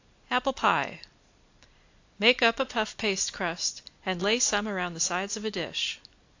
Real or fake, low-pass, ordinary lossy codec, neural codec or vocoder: real; 7.2 kHz; AAC, 48 kbps; none